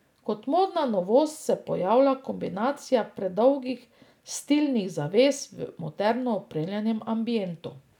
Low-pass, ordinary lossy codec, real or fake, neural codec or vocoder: 19.8 kHz; none; real; none